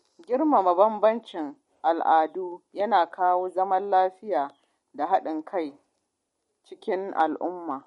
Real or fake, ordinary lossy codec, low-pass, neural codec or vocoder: real; MP3, 48 kbps; 19.8 kHz; none